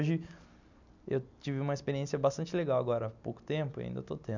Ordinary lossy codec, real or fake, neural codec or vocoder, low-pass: none; real; none; 7.2 kHz